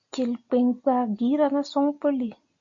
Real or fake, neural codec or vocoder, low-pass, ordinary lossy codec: real; none; 7.2 kHz; MP3, 48 kbps